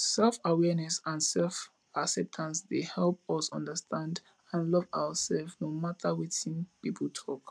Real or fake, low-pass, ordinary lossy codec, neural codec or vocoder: real; none; none; none